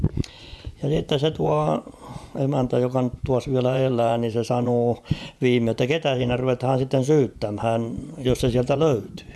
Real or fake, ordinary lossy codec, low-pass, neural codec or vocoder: fake; none; none; vocoder, 24 kHz, 100 mel bands, Vocos